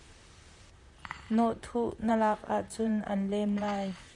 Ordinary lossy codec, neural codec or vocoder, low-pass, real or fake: MP3, 96 kbps; vocoder, 44.1 kHz, 128 mel bands, Pupu-Vocoder; 10.8 kHz; fake